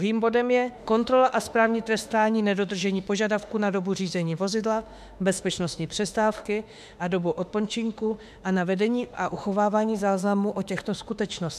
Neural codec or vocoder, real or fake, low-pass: autoencoder, 48 kHz, 32 numbers a frame, DAC-VAE, trained on Japanese speech; fake; 14.4 kHz